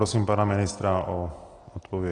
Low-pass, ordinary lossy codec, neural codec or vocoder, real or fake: 9.9 kHz; AAC, 32 kbps; none; real